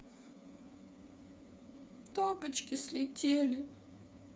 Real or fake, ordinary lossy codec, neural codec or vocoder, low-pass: fake; none; codec, 16 kHz, 4 kbps, FreqCodec, smaller model; none